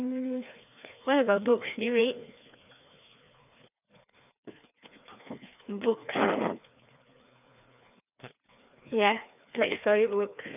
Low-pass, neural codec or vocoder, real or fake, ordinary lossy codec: 3.6 kHz; codec, 16 kHz, 2 kbps, FreqCodec, larger model; fake; none